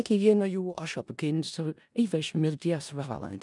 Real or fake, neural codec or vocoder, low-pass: fake; codec, 16 kHz in and 24 kHz out, 0.4 kbps, LongCat-Audio-Codec, four codebook decoder; 10.8 kHz